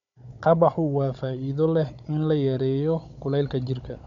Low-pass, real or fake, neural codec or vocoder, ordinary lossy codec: 7.2 kHz; fake; codec, 16 kHz, 16 kbps, FunCodec, trained on Chinese and English, 50 frames a second; none